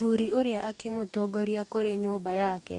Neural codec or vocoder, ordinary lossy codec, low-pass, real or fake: codec, 44.1 kHz, 2.6 kbps, DAC; MP3, 64 kbps; 10.8 kHz; fake